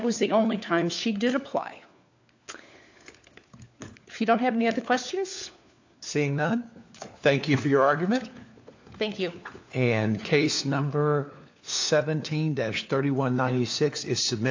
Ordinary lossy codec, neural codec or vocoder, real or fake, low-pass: AAC, 48 kbps; codec, 16 kHz, 4 kbps, FunCodec, trained on LibriTTS, 50 frames a second; fake; 7.2 kHz